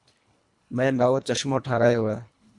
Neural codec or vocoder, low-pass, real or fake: codec, 24 kHz, 1.5 kbps, HILCodec; 10.8 kHz; fake